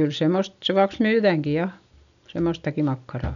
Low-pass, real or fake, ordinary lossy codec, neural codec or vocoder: 7.2 kHz; real; none; none